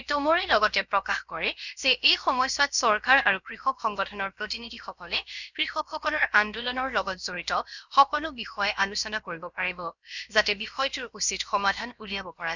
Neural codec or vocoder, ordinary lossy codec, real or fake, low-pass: codec, 16 kHz, about 1 kbps, DyCAST, with the encoder's durations; none; fake; 7.2 kHz